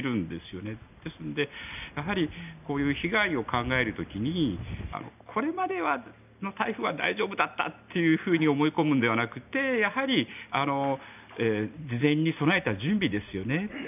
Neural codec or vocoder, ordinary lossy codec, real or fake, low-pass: none; none; real; 3.6 kHz